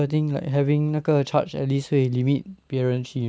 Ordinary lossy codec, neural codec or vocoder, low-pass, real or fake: none; none; none; real